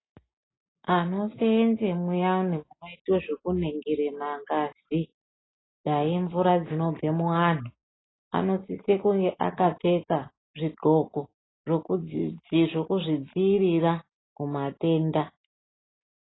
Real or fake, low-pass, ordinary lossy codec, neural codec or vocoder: real; 7.2 kHz; AAC, 16 kbps; none